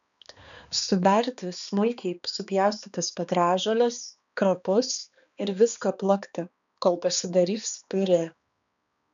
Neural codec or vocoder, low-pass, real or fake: codec, 16 kHz, 2 kbps, X-Codec, HuBERT features, trained on balanced general audio; 7.2 kHz; fake